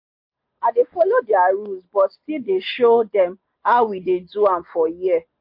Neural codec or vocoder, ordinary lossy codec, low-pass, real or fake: none; MP3, 32 kbps; 5.4 kHz; real